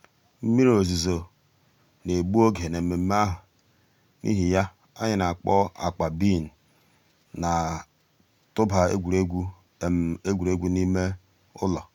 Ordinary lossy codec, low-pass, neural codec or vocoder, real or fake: none; 19.8 kHz; none; real